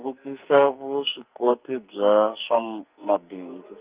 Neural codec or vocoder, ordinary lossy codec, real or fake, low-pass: codec, 44.1 kHz, 2.6 kbps, SNAC; Opus, 32 kbps; fake; 3.6 kHz